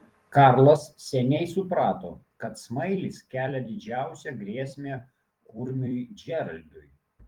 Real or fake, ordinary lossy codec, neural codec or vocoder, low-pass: fake; Opus, 24 kbps; vocoder, 44.1 kHz, 128 mel bands every 256 samples, BigVGAN v2; 19.8 kHz